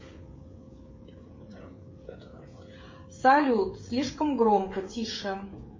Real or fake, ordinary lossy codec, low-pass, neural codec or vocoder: fake; MP3, 32 kbps; 7.2 kHz; codec, 16 kHz, 16 kbps, FreqCodec, smaller model